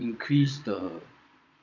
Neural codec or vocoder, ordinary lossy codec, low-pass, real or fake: vocoder, 22.05 kHz, 80 mel bands, Vocos; none; 7.2 kHz; fake